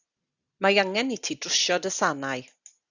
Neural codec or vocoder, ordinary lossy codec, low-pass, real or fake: none; Opus, 64 kbps; 7.2 kHz; real